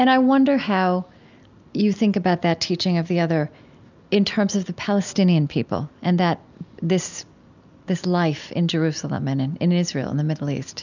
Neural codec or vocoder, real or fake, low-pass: none; real; 7.2 kHz